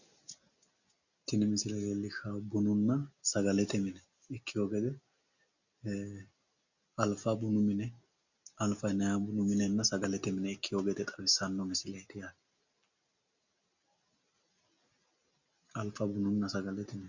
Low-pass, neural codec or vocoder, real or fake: 7.2 kHz; none; real